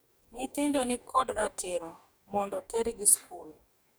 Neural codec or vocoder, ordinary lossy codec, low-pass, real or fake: codec, 44.1 kHz, 2.6 kbps, DAC; none; none; fake